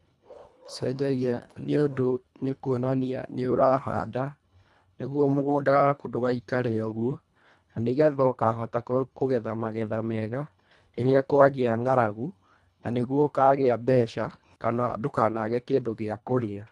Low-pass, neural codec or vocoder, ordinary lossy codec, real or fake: none; codec, 24 kHz, 1.5 kbps, HILCodec; none; fake